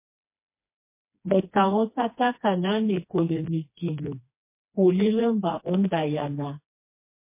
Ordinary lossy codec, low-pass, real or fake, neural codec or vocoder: MP3, 24 kbps; 3.6 kHz; fake; codec, 16 kHz, 2 kbps, FreqCodec, smaller model